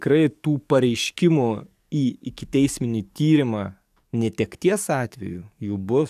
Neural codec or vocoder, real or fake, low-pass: codec, 44.1 kHz, 7.8 kbps, DAC; fake; 14.4 kHz